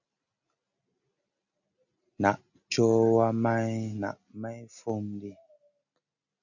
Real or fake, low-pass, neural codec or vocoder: real; 7.2 kHz; none